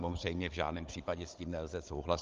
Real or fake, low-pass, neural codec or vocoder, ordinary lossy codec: fake; 7.2 kHz; codec, 16 kHz, 16 kbps, FunCodec, trained on Chinese and English, 50 frames a second; Opus, 32 kbps